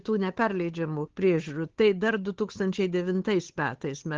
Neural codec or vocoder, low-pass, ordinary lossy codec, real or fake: codec, 16 kHz, 8 kbps, FunCodec, trained on Chinese and English, 25 frames a second; 7.2 kHz; Opus, 16 kbps; fake